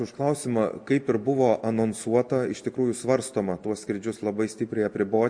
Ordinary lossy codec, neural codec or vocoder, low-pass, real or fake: MP3, 48 kbps; none; 9.9 kHz; real